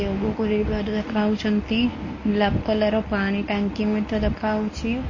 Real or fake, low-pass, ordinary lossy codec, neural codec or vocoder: fake; 7.2 kHz; MP3, 32 kbps; codec, 24 kHz, 0.9 kbps, WavTokenizer, medium speech release version 1